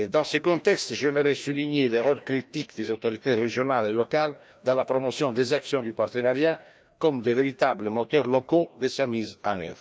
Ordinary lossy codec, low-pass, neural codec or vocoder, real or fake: none; none; codec, 16 kHz, 1 kbps, FreqCodec, larger model; fake